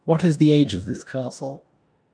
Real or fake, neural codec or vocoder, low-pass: fake; codec, 16 kHz in and 24 kHz out, 0.9 kbps, LongCat-Audio-Codec, four codebook decoder; 9.9 kHz